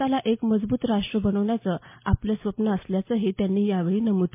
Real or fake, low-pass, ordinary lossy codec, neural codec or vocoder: real; 3.6 kHz; MP3, 24 kbps; none